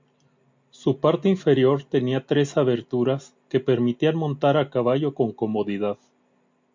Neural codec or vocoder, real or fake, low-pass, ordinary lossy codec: none; real; 7.2 kHz; MP3, 48 kbps